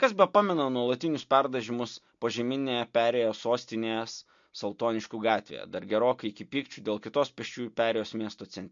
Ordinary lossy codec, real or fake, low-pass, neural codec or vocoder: MP3, 48 kbps; real; 7.2 kHz; none